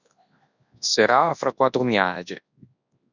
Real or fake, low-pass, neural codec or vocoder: fake; 7.2 kHz; codec, 24 kHz, 0.9 kbps, WavTokenizer, large speech release